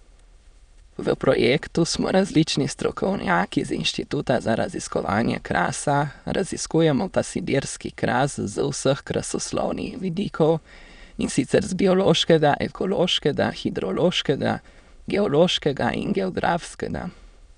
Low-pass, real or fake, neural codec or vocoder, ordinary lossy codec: 9.9 kHz; fake; autoencoder, 22.05 kHz, a latent of 192 numbers a frame, VITS, trained on many speakers; none